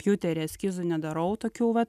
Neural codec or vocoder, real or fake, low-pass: none; real; 14.4 kHz